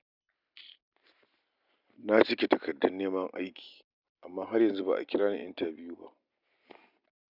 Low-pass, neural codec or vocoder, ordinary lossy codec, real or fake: 5.4 kHz; none; none; real